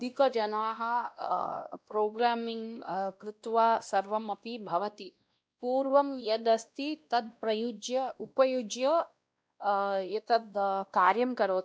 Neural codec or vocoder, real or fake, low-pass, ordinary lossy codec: codec, 16 kHz, 1 kbps, X-Codec, WavLM features, trained on Multilingual LibriSpeech; fake; none; none